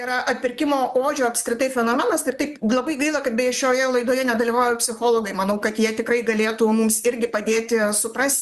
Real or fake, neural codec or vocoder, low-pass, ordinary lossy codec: fake; vocoder, 44.1 kHz, 128 mel bands, Pupu-Vocoder; 14.4 kHz; Opus, 64 kbps